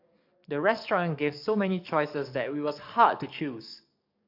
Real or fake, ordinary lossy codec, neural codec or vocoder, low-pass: fake; MP3, 48 kbps; codec, 44.1 kHz, 7.8 kbps, DAC; 5.4 kHz